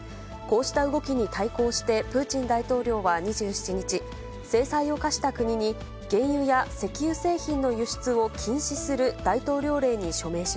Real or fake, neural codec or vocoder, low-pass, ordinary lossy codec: real; none; none; none